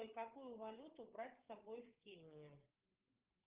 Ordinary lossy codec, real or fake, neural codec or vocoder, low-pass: Opus, 32 kbps; fake; codec, 16 kHz, 16 kbps, FreqCodec, larger model; 3.6 kHz